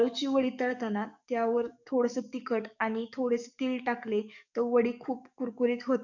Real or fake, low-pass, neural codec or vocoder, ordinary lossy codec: fake; 7.2 kHz; codec, 44.1 kHz, 7.8 kbps, DAC; none